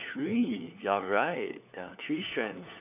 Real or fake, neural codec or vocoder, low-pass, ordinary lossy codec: fake; codec, 16 kHz, 4 kbps, FunCodec, trained on LibriTTS, 50 frames a second; 3.6 kHz; none